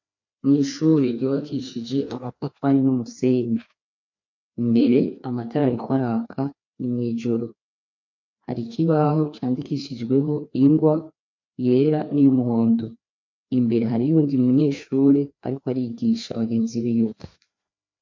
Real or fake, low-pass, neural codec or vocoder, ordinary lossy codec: fake; 7.2 kHz; codec, 16 kHz, 2 kbps, FreqCodec, larger model; MP3, 48 kbps